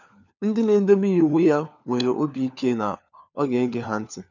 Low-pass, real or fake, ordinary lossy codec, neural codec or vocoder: 7.2 kHz; fake; none; codec, 16 kHz, 4 kbps, FunCodec, trained on LibriTTS, 50 frames a second